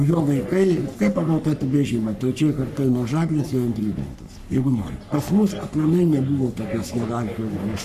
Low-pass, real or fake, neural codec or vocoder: 14.4 kHz; fake; codec, 44.1 kHz, 3.4 kbps, Pupu-Codec